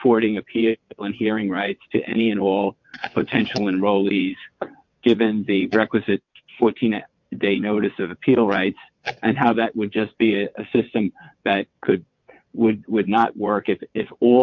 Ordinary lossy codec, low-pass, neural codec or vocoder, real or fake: MP3, 48 kbps; 7.2 kHz; vocoder, 22.05 kHz, 80 mel bands, WaveNeXt; fake